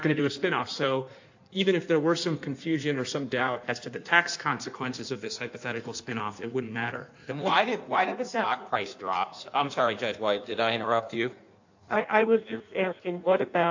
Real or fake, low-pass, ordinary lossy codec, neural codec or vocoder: fake; 7.2 kHz; AAC, 48 kbps; codec, 16 kHz in and 24 kHz out, 1.1 kbps, FireRedTTS-2 codec